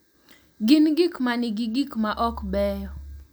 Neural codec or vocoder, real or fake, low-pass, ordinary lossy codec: none; real; none; none